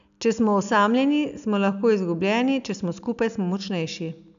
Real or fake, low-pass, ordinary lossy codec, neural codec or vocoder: real; 7.2 kHz; none; none